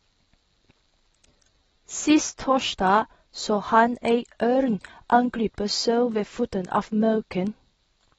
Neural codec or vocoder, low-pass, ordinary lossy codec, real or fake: none; 19.8 kHz; AAC, 24 kbps; real